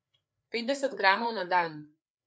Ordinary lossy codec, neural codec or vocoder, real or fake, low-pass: none; codec, 16 kHz, 4 kbps, FreqCodec, larger model; fake; none